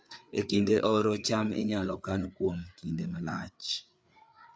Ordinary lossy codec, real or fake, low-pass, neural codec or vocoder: none; fake; none; codec, 16 kHz, 4 kbps, FreqCodec, larger model